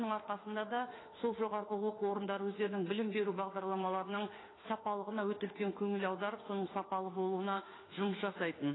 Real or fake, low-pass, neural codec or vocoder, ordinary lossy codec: fake; 7.2 kHz; autoencoder, 48 kHz, 32 numbers a frame, DAC-VAE, trained on Japanese speech; AAC, 16 kbps